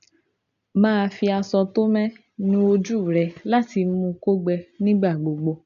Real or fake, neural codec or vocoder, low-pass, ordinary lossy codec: real; none; 7.2 kHz; AAC, 96 kbps